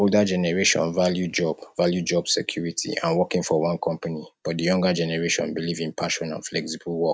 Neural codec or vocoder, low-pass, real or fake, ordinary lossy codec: none; none; real; none